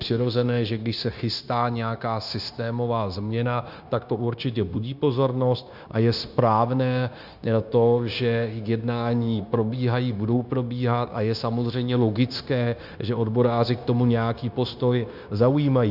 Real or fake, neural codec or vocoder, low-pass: fake; codec, 16 kHz, 0.9 kbps, LongCat-Audio-Codec; 5.4 kHz